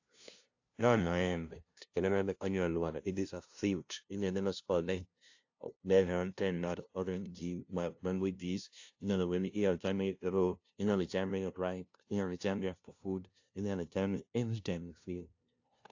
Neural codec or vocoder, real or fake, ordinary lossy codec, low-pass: codec, 16 kHz, 0.5 kbps, FunCodec, trained on LibriTTS, 25 frames a second; fake; AAC, 48 kbps; 7.2 kHz